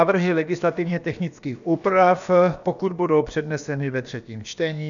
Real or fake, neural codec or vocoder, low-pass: fake; codec, 16 kHz, about 1 kbps, DyCAST, with the encoder's durations; 7.2 kHz